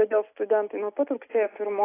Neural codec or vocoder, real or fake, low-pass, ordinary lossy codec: none; real; 3.6 kHz; AAC, 16 kbps